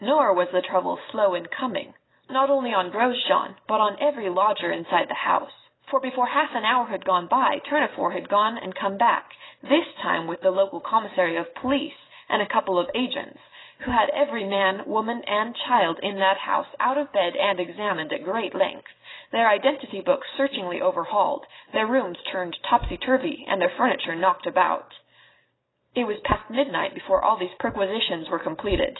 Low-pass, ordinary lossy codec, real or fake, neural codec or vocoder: 7.2 kHz; AAC, 16 kbps; real; none